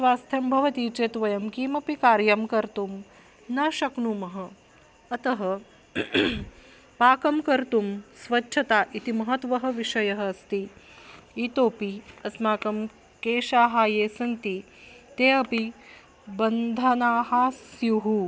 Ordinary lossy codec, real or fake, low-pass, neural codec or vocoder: none; real; none; none